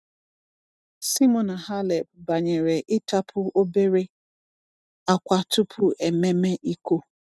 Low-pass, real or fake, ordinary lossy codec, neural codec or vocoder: none; real; none; none